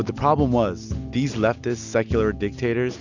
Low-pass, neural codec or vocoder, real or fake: 7.2 kHz; none; real